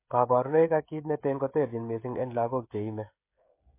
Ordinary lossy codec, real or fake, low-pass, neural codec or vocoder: MP3, 24 kbps; fake; 3.6 kHz; codec, 16 kHz, 16 kbps, FreqCodec, smaller model